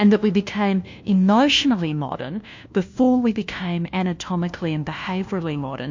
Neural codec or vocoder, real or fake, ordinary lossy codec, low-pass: codec, 16 kHz, 1 kbps, FunCodec, trained on LibriTTS, 50 frames a second; fake; MP3, 48 kbps; 7.2 kHz